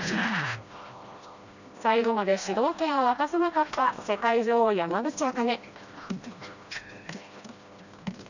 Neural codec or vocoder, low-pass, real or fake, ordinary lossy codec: codec, 16 kHz, 1 kbps, FreqCodec, smaller model; 7.2 kHz; fake; none